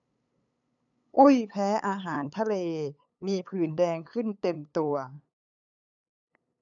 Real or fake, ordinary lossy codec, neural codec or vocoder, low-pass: fake; none; codec, 16 kHz, 8 kbps, FunCodec, trained on LibriTTS, 25 frames a second; 7.2 kHz